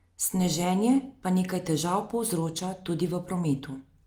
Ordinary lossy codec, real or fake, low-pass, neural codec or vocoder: Opus, 32 kbps; real; 19.8 kHz; none